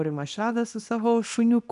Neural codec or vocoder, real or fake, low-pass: codec, 24 kHz, 0.9 kbps, WavTokenizer, small release; fake; 10.8 kHz